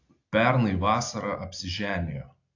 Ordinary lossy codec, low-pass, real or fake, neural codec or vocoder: AAC, 48 kbps; 7.2 kHz; real; none